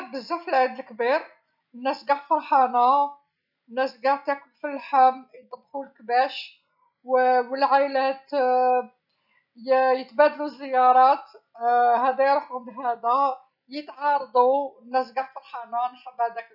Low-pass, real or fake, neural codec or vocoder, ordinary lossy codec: 5.4 kHz; real; none; none